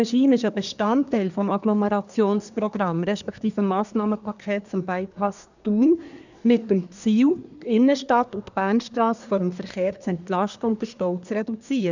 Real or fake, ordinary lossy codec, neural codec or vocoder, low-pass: fake; none; codec, 24 kHz, 1 kbps, SNAC; 7.2 kHz